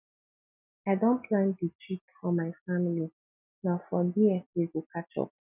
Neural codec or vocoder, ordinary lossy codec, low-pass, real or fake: none; none; 3.6 kHz; real